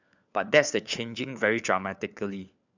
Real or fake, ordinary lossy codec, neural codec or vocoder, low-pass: fake; none; codec, 16 kHz, 4 kbps, FunCodec, trained on LibriTTS, 50 frames a second; 7.2 kHz